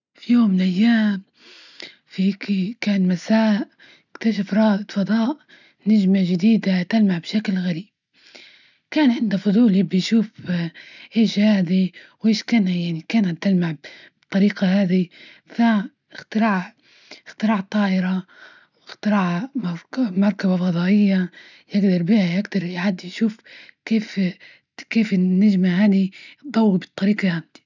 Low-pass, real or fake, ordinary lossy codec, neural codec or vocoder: 7.2 kHz; real; none; none